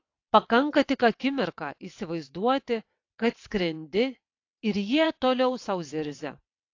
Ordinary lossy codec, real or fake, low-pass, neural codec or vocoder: AAC, 48 kbps; fake; 7.2 kHz; vocoder, 22.05 kHz, 80 mel bands, WaveNeXt